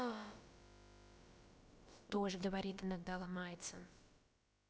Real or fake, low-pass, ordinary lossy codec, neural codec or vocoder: fake; none; none; codec, 16 kHz, about 1 kbps, DyCAST, with the encoder's durations